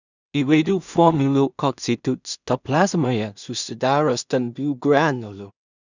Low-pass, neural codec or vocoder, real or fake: 7.2 kHz; codec, 16 kHz in and 24 kHz out, 0.4 kbps, LongCat-Audio-Codec, two codebook decoder; fake